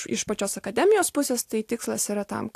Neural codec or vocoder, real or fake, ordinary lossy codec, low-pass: none; real; AAC, 64 kbps; 14.4 kHz